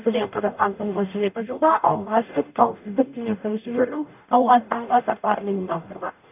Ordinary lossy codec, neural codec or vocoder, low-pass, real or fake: none; codec, 44.1 kHz, 0.9 kbps, DAC; 3.6 kHz; fake